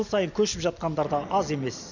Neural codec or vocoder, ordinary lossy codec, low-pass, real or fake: none; none; 7.2 kHz; real